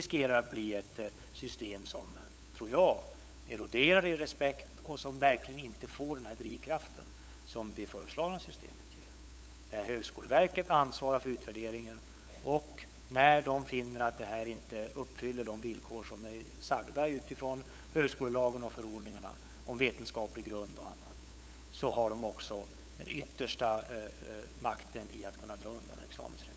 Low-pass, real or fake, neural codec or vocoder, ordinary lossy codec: none; fake; codec, 16 kHz, 8 kbps, FunCodec, trained on LibriTTS, 25 frames a second; none